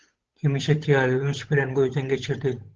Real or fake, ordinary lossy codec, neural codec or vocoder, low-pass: fake; Opus, 16 kbps; codec, 16 kHz, 8 kbps, FunCodec, trained on Chinese and English, 25 frames a second; 7.2 kHz